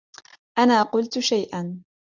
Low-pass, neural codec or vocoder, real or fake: 7.2 kHz; none; real